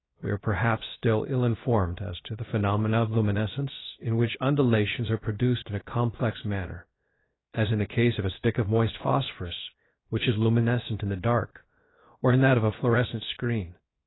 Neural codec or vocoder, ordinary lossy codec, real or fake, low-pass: codec, 16 kHz, 0.7 kbps, FocalCodec; AAC, 16 kbps; fake; 7.2 kHz